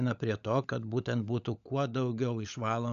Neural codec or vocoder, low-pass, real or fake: codec, 16 kHz, 16 kbps, FunCodec, trained on LibriTTS, 50 frames a second; 7.2 kHz; fake